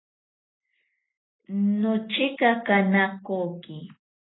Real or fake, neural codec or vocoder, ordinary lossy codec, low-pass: real; none; AAC, 16 kbps; 7.2 kHz